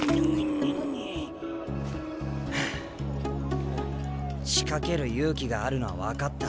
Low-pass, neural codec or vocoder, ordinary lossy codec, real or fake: none; none; none; real